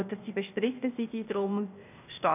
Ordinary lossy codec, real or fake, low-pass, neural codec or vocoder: none; fake; 3.6 kHz; codec, 16 kHz, 0.8 kbps, ZipCodec